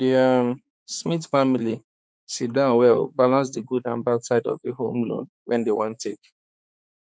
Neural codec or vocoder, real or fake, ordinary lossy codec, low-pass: codec, 16 kHz, 4 kbps, X-Codec, HuBERT features, trained on balanced general audio; fake; none; none